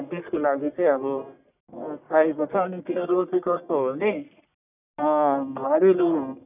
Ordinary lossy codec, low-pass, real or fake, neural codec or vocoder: none; 3.6 kHz; fake; codec, 44.1 kHz, 1.7 kbps, Pupu-Codec